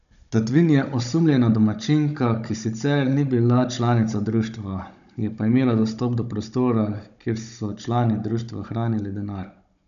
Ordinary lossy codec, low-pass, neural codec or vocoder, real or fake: none; 7.2 kHz; codec, 16 kHz, 16 kbps, FunCodec, trained on Chinese and English, 50 frames a second; fake